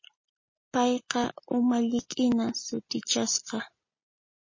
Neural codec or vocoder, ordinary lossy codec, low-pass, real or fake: none; MP3, 32 kbps; 7.2 kHz; real